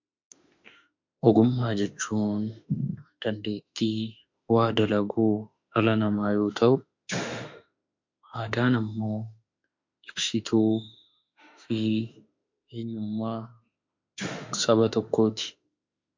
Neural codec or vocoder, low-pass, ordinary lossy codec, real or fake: autoencoder, 48 kHz, 32 numbers a frame, DAC-VAE, trained on Japanese speech; 7.2 kHz; MP3, 48 kbps; fake